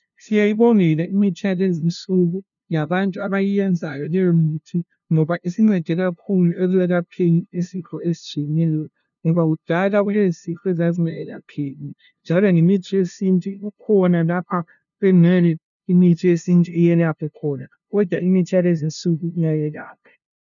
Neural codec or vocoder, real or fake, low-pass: codec, 16 kHz, 0.5 kbps, FunCodec, trained on LibriTTS, 25 frames a second; fake; 7.2 kHz